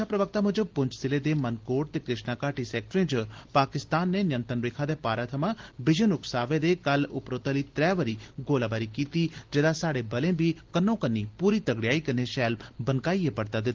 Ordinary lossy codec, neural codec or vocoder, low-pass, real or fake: Opus, 16 kbps; none; 7.2 kHz; real